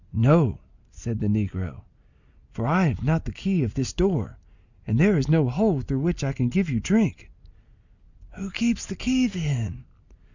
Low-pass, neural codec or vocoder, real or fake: 7.2 kHz; none; real